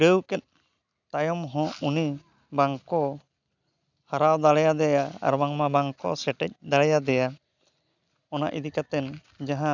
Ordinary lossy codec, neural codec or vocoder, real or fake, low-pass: none; none; real; 7.2 kHz